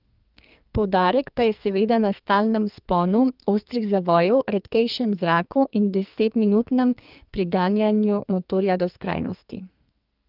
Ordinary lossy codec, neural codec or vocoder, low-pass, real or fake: Opus, 24 kbps; codec, 44.1 kHz, 2.6 kbps, SNAC; 5.4 kHz; fake